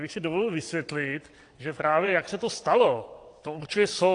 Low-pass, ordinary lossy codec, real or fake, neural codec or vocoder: 9.9 kHz; AAC, 48 kbps; fake; vocoder, 22.05 kHz, 80 mel bands, WaveNeXt